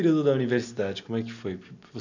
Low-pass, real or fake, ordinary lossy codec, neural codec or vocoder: 7.2 kHz; real; none; none